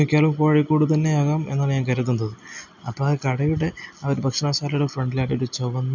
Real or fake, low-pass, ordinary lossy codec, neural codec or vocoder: real; 7.2 kHz; none; none